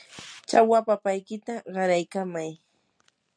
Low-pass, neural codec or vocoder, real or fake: 9.9 kHz; none; real